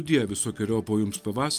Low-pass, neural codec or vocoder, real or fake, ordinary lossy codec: 14.4 kHz; none; real; Opus, 32 kbps